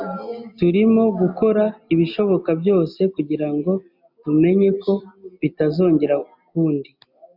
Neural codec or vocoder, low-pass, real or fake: none; 5.4 kHz; real